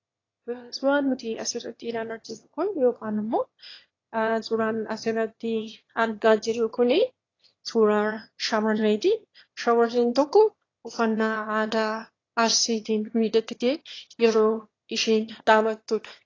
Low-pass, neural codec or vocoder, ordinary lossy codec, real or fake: 7.2 kHz; autoencoder, 22.05 kHz, a latent of 192 numbers a frame, VITS, trained on one speaker; AAC, 32 kbps; fake